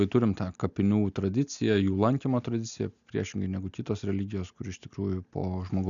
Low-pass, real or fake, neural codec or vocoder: 7.2 kHz; real; none